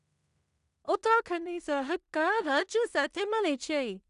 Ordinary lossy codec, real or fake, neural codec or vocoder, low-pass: none; fake; codec, 16 kHz in and 24 kHz out, 0.4 kbps, LongCat-Audio-Codec, two codebook decoder; 10.8 kHz